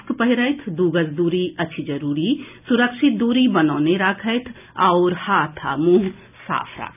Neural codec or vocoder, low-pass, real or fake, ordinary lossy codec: none; 3.6 kHz; real; none